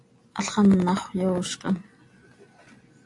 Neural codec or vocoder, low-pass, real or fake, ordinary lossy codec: none; 10.8 kHz; real; AAC, 48 kbps